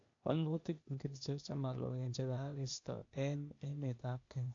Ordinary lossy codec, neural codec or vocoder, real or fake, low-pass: AAC, 48 kbps; codec, 16 kHz, 0.8 kbps, ZipCodec; fake; 7.2 kHz